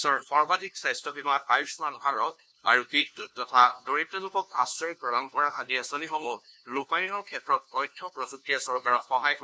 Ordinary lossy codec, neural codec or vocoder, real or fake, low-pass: none; codec, 16 kHz, 1 kbps, FunCodec, trained on LibriTTS, 50 frames a second; fake; none